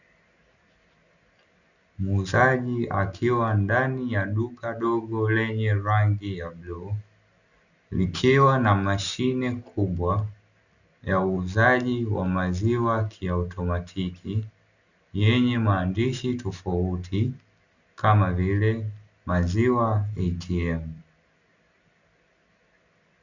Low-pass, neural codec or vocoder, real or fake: 7.2 kHz; none; real